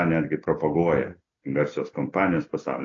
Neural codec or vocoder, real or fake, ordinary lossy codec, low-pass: none; real; AAC, 32 kbps; 7.2 kHz